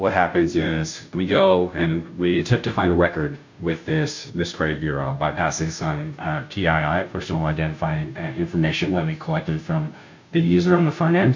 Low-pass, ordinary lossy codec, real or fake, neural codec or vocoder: 7.2 kHz; MP3, 64 kbps; fake; codec, 16 kHz, 0.5 kbps, FunCodec, trained on Chinese and English, 25 frames a second